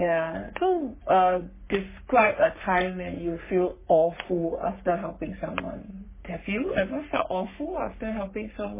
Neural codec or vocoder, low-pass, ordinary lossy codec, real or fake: codec, 44.1 kHz, 3.4 kbps, Pupu-Codec; 3.6 kHz; MP3, 16 kbps; fake